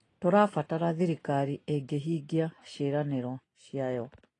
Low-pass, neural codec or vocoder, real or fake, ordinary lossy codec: 10.8 kHz; none; real; AAC, 32 kbps